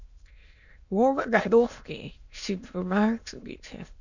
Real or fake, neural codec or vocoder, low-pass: fake; autoencoder, 22.05 kHz, a latent of 192 numbers a frame, VITS, trained on many speakers; 7.2 kHz